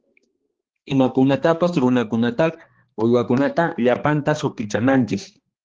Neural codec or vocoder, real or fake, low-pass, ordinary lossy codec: codec, 16 kHz, 2 kbps, X-Codec, HuBERT features, trained on balanced general audio; fake; 7.2 kHz; Opus, 16 kbps